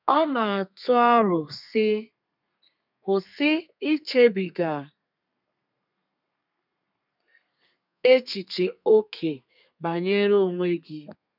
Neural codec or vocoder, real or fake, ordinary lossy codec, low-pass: codec, 32 kHz, 1.9 kbps, SNAC; fake; none; 5.4 kHz